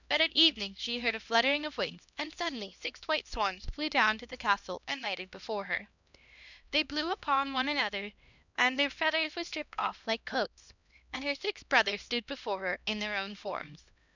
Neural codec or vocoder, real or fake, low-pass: codec, 16 kHz, 1 kbps, X-Codec, HuBERT features, trained on LibriSpeech; fake; 7.2 kHz